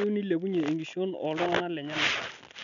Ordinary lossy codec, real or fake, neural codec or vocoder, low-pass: none; real; none; 7.2 kHz